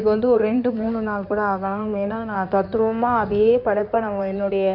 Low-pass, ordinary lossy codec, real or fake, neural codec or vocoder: 5.4 kHz; none; fake; codec, 16 kHz in and 24 kHz out, 2.2 kbps, FireRedTTS-2 codec